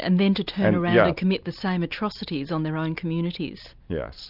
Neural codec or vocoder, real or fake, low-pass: none; real; 5.4 kHz